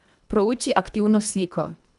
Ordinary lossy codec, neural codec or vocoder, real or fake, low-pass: none; codec, 24 kHz, 1.5 kbps, HILCodec; fake; 10.8 kHz